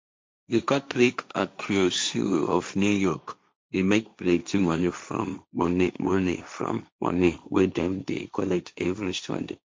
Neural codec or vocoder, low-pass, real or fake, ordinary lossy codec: codec, 16 kHz, 1.1 kbps, Voila-Tokenizer; none; fake; none